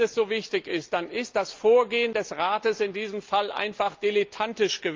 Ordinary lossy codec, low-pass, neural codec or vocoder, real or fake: Opus, 24 kbps; 7.2 kHz; none; real